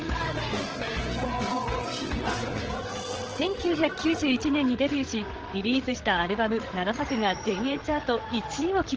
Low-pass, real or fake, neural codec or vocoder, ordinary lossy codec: 7.2 kHz; fake; codec, 16 kHz, 8 kbps, FreqCodec, larger model; Opus, 16 kbps